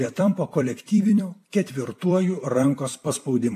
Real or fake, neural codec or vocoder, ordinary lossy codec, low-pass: fake; vocoder, 44.1 kHz, 128 mel bands every 256 samples, BigVGAN v2; AAC, 64 kbps; 14.4 kHz